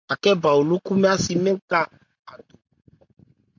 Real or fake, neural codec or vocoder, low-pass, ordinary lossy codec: real; none; 7.2 kHz; MP3, 64 kbps